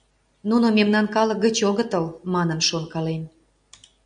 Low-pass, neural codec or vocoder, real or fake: 9.9 kHz; none; real